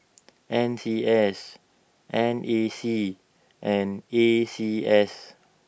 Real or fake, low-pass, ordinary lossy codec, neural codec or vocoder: real; none; none; none